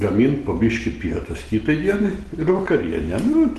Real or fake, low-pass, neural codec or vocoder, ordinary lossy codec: real; 10.8 kHz; none; Opus, 16 kbps